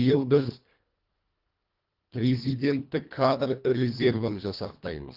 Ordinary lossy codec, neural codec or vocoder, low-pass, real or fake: Opus, 32 kbps; codec, 24 kHz, 1.5 kbps, HILCodec; 5.4 kHz; fake